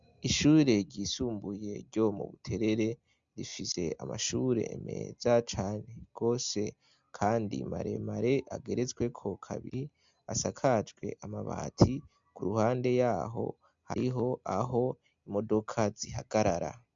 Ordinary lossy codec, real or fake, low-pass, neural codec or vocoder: MP3, 64 kbps; real; 7.2 kHz; none